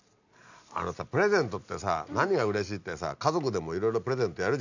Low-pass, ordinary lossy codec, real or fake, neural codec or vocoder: 7.2 kHz; none; real; none